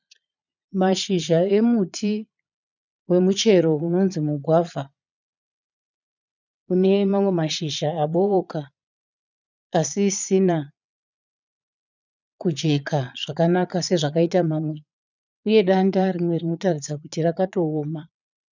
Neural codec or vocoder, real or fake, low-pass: vocoder, 22.05 kHz, 80 mel bands, Vocos; fake; 7.2 kHz